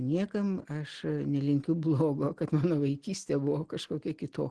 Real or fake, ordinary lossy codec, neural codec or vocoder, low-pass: real; Opus, 16 kbps; none; 10.8 kHz